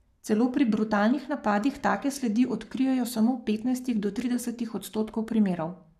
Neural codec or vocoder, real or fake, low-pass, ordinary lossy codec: codec, 44.1 kHz, 7.8 kbps, Pupu-Codec; fake; 14.4 kHz; none